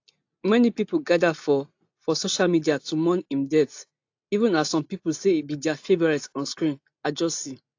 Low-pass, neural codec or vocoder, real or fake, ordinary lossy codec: 7.2 kHz; none; real; AAC, 48 kbps